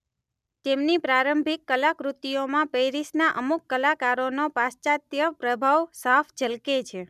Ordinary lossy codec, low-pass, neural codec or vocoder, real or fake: none; 14.4 kHz; none; real